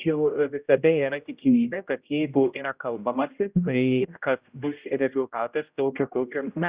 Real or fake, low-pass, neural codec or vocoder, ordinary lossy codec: fake; 3.6 kHz; codec, 16 kHz, 0.5 kbps, X-Codec, HuBERT features, trained on general audio; Opus, 64 kbps